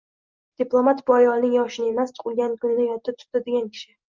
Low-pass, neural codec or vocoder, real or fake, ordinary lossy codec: 7.2 kHz; none; real; Opus, 24 kbps